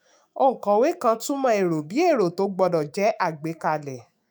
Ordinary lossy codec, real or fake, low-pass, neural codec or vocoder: none; fake; none; autoencoder, 48 kHz, 128 numbers a frame, DAC-VAE, trained on Japanese speech